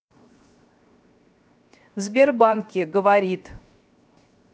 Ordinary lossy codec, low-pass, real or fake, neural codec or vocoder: none; none; fake; codec, 16 kHz, 0.7 kbps, FocalCodec